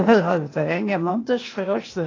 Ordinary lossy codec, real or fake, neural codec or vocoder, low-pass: AAC, 32 kbps; fake; codec, 16 kHz, 0.8 kbps, ZipCodec; 7.2 kHz